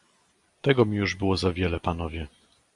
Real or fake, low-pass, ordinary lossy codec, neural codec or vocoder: real; 10.8 kHz; Opus, 64 kbps; none